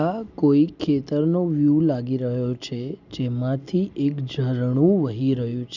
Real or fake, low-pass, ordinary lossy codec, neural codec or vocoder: real; 7.2 kHz; none; none